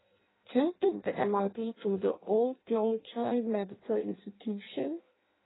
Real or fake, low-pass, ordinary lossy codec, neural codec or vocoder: fake; 7.2 kHz; AAC, 16 kbps; codec, 16 kHz in and 24 kHz out, 0.6 kbps, FireRedTTS-2 codec